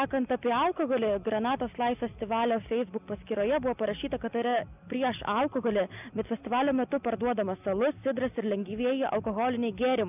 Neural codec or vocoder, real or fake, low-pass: none; real; 3.6 kHz